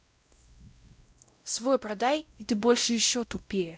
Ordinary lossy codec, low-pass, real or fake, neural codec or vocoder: none; none; fake; codec, 16 kHz, 0.5 kbps, X-Codec, WavLM features, trained on Multilingual LibriSpeech